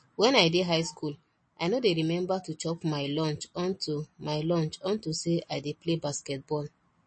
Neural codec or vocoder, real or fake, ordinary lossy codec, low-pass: none; real; MP3, 32 kbps; 9.9 kHz